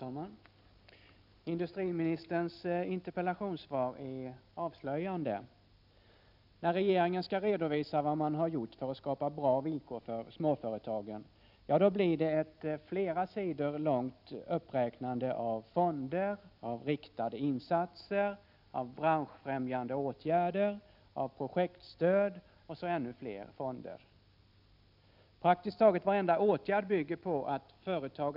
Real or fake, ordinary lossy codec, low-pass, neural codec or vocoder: real; none; 5.4 kHz; none